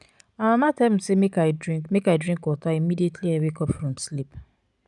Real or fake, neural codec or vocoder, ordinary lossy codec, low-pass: real; none; none; 10.8 kHz